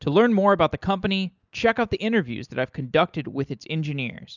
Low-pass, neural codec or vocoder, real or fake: 7.2 kHz; none; real